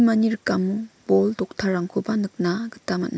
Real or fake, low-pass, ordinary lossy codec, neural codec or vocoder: real; none; none; none